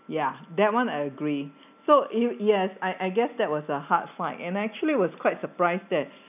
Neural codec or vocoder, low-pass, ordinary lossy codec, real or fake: none; 3.6 kHz; none; real